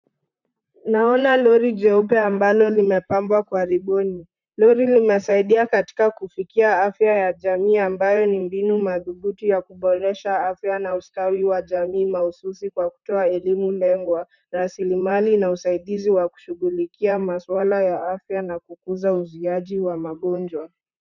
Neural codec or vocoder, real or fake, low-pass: vocoder, 44.1 kHz, 128 mel bands, Pupu-Vocoder; fake; 7.2 kHz